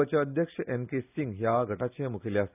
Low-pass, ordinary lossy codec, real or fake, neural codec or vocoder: 3.6 kHz; none; real; none